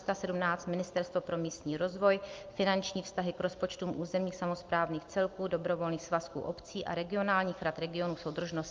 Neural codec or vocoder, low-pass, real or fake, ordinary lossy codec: none; 7.2 kHz; real; Opus, 24 kbps